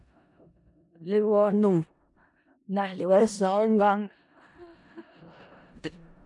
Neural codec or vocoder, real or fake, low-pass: codec, 16 kHz in and 24 kHz out, 0.4 kbps, LongCat-Audio-Codec, four codebook decoder; fake; 10.8 kHz